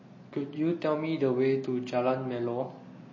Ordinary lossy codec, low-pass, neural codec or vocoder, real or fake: MP3, 32 kbps; 7.2 kHz; none; real